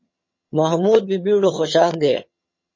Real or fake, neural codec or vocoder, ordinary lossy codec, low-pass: fake; vocoder, 22.05 kHz, 80 mel bands, HiFi-GAN; MP3, 32 kbps; 7.2 kHz